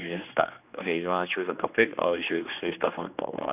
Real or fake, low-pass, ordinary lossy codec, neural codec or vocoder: fake; 3.6 kHz; none; codec, 16 kHz, 2 kbps, X-Codec, HuBERT features, trained on general audio